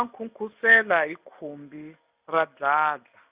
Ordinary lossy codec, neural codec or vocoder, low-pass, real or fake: Opus, 64 kbps; none; 3.6 kHz; real